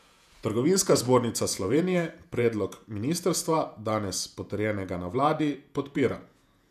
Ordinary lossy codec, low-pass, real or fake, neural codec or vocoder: none; 14.4 kHz; fake; vocoder, 48 kHz, 128 mel bands, Vocos